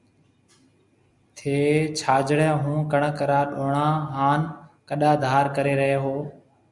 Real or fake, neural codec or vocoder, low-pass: real; none; 10.8 kHz